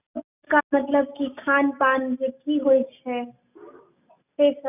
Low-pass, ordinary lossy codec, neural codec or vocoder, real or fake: 3.6 kHz; none; none; real